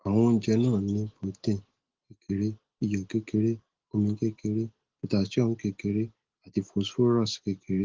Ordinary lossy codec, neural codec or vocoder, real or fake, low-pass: Opus, 16 kbps; none; real; 7.2 kHz